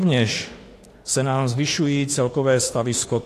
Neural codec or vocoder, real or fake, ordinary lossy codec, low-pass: autoencoder, 48 kHz, 32 numbers a frame, DAC-VAE, trained on Japanese speech; fake; AAC, 48 kbps; 14.4 kHz